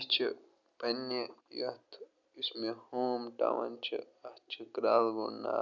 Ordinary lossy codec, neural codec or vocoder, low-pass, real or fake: none; none; 7.2 kHz; real